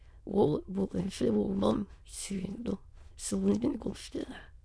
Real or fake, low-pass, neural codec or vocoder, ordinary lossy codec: fake; none; autoencoder, 22.05 kHz, a latent of 192 numbers a frame, VITS, trained on many speakers; none